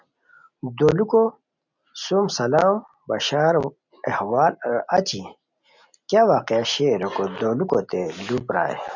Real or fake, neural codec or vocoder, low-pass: real; none; 7.2 kHz